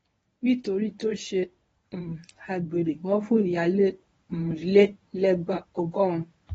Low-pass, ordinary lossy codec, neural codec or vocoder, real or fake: 10.8 kHz; AAC, 24 kbps; codec, 24 kHz, 0.9 kbps, WavTokenizer, medium speech release version 1; fake